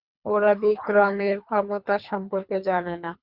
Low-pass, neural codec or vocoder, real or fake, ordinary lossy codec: 5.4 kHz; codec, 24 kHz, 3 kbps, HILCodec; fake; Opus, 64 kbps